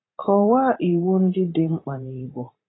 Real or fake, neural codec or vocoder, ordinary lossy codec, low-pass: fake; vocoder, 44.1 kHz, 80 mel bands, Vocos; AAC, 16 kbps; 7.2 kHz